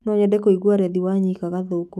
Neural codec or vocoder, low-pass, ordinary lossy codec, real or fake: autoencoder, 48 kHz, 128 numbers a frame, DAC-VAE, trained on Japanese speech; 14.4 kHz; none; fake